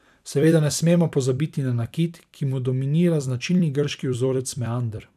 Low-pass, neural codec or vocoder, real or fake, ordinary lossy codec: 14.4 kHz; vocoder, 44.1 kHz, 128 mel bands every 256 samples, BigVGAN v2; fake; none